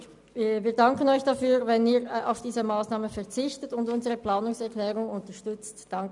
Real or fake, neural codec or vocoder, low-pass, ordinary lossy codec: real; none; 10.8 kHz; none